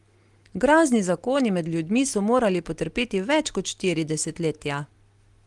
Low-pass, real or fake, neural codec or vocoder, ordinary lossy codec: 10.8 kHz; real; none; Opus, 24 kbps